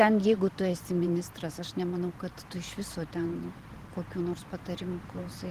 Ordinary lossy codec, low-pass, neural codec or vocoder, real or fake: Opus, 16 kbps; 14.4 kHz; vocoder, 44.1 kHz, 128 mel bands every 512 samples, BigVGAN v2; fake